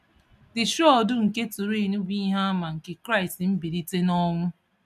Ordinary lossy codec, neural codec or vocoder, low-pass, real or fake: none; none; 14.4 kHz; real